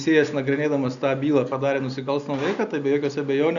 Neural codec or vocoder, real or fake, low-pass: none; real; 7.2 kHz